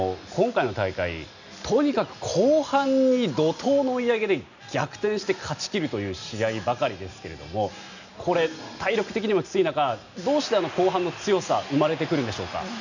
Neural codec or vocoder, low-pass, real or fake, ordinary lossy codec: none; 7.2 kHz; real; none